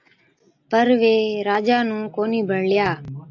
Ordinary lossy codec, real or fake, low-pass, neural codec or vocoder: AAC, 48 kbps; real; 7.2 kHz; none